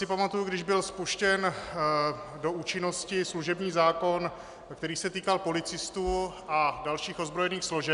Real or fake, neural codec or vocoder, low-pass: real; none; 10.8 kHz